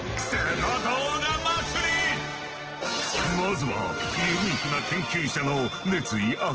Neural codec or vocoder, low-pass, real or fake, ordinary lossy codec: none; 7.2 kHz; real; Opus, 16 kbps